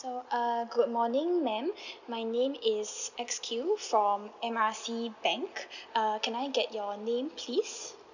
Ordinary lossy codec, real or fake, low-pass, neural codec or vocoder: none; real; 7.2 kHz; none